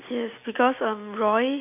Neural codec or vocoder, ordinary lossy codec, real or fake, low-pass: none; none; real; 3.6 kHz